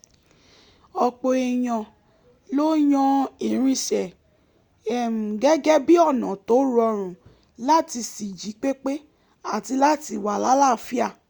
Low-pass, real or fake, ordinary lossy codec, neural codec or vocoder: 19.8 kHz; real; Opus, 64 kbps; none